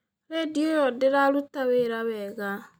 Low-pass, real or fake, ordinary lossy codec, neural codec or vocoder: 19.8 kHz; real; none; none